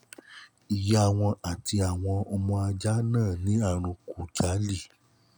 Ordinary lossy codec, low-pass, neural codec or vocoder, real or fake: none; none; none; real